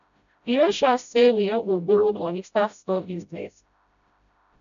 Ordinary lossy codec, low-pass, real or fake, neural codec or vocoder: none; 7.2 kHz; fake; codec, 16 kHz, 0.5 kbps, FreqCodec, smaller model